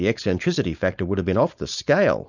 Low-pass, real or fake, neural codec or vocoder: 7.2 kHz; fake; codec, 16 kHz, 4.8 kbps, FACodec